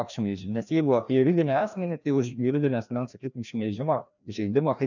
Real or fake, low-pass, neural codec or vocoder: fake; 7.2 kHz; codec, 16 kHz, 1 kbps, FreqCodec, larger model